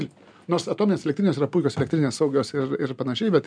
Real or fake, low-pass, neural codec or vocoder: real; 9.9 kHz; none